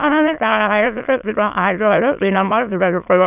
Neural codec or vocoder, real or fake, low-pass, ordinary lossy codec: autoencoder, 22.05 kHz, a latent of 192 numbers a frame, VITS, trained on many speakers; fake; 3.6 kHz; none